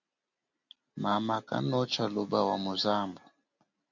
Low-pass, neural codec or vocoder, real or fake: 7.2 kHz; none; real